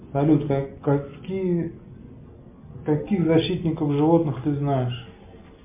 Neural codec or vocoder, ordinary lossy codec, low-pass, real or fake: none; MP3, 32 kbps; 3.6 kHz; real